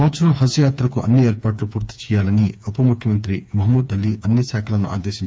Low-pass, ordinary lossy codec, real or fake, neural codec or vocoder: none; none; fake; codec, 16 kHz, 4 kbps, FreqCodec, smaller model